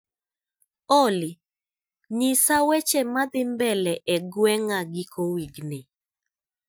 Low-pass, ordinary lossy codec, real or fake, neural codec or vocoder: none; none; real; none